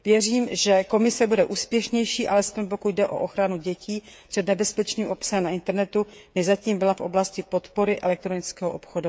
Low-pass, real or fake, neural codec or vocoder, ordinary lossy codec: none; fake; codec, 16 kHz, 16 kbps, FreqCodec, smaller model; none